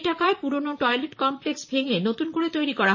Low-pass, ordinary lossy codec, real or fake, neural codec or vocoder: 7.2 kHz; MP3, 32 kbps; fake; vocoder, 44.1 kHz, 128 mel bands, Pupu-Vocoder